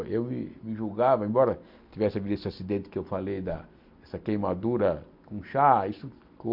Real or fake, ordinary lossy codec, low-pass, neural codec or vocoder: real; MP3, 48 kbps; 5.4 kHz; none